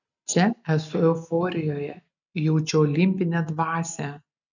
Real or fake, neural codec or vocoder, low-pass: real; none; 7.2 kHz